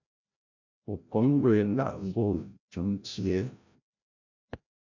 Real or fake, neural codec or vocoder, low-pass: fake; codec, 16 kHz, 0.5 kbps, FreqCodec, larger model; 7.2 kHz